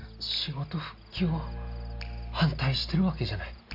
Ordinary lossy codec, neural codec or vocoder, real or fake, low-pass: MP3, 48 kbps; none; real; 5.4 kHz